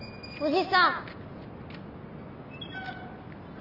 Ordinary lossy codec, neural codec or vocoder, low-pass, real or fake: none; none; 5.4 kHz; real